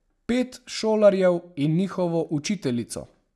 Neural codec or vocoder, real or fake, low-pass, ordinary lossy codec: none; real; none; none